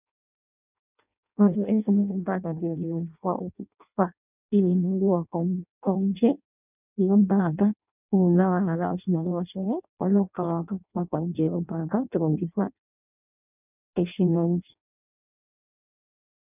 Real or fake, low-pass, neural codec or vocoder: fake; 3.6 kHz; codec, 16 kHz in and 24 kHz out, 0.6 kbps, FireRedTTS-2 codec